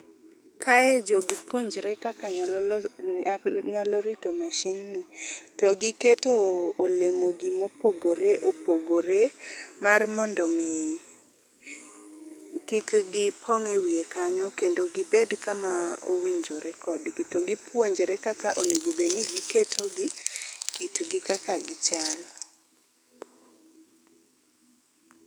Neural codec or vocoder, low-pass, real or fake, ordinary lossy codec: codec, 44.1 kHz, 2.6 kbps, SNAC; none; fake; none